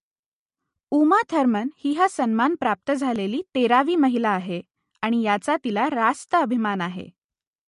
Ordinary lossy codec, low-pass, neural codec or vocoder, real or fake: MP3, 48 kbps; 14.4 kHz; none; real